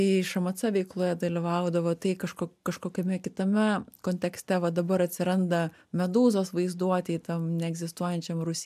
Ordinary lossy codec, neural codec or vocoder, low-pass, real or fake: MP3, 96 kbps; none; 14.4 kHz; real